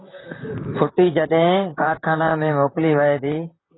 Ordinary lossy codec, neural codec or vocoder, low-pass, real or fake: AAC, 16 kbps; vocoder, 44.1 kHz, 128 mel bands, Pupu-Vocoder; 7.2 kHz; fake